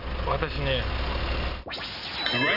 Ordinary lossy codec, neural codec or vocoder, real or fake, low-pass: none; none; real; 5.4 kHz